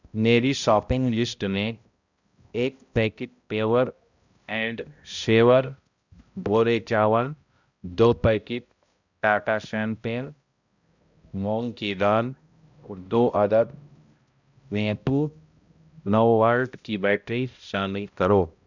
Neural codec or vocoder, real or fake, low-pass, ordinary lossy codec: codec, 16 kHz, 0.5 kbps, X-Codec, HuBERT features, trained on balanced general audio; fake; 7.2 kHz; Opus, 64 kbps